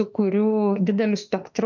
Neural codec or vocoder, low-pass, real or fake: autoencoder, 48 kHz, 32 numbers a frame, DAC-VAE, trained on Japanese speech; 7.2 kHz; fake